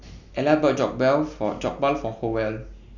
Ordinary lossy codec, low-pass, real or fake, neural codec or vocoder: none; 7.2 kHz; real; none